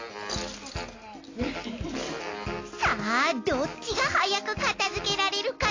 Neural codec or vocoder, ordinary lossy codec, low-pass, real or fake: none; AAC, 32 kbps; 7.2 kHz; real